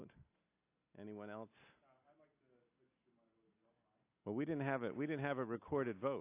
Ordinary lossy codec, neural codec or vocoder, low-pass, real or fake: AAC, 24 kbps; none; 3.6 kHz; real